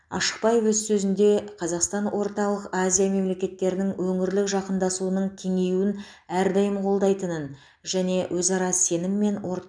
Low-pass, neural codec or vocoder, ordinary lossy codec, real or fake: 9.9 kHz; none; none; real